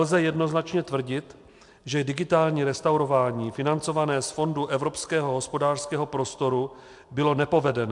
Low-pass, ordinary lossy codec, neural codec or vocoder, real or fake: 10.8 kHz; MP3, 64 kbps; none; real